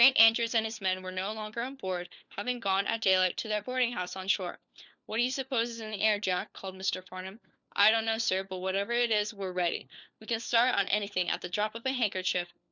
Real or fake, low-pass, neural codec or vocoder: fake; 7.2 kHz; codec, 16 kHz, 4 kbps, FunCodec, trained on LibriTTS, 50 frames a second